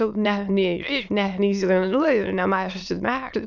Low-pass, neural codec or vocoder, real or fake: 7.2 kHz; autoencoder, 22.05 kHz, a latent of 192 numbers a frame, VITS, trained on many speakers; fake